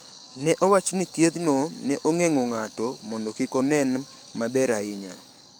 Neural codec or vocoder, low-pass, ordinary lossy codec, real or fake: codec, 44.1 kHz, 7.8 kbps, DAC; none; none; fake